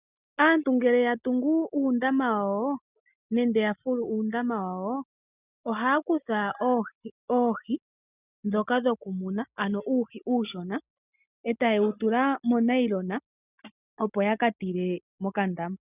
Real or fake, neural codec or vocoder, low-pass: real; none; 3.6 kHz